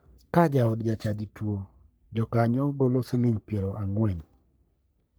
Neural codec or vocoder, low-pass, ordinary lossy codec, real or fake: codec, 44.1 kHz, 3.4 kbps, Pupu-Codec; none; none; fake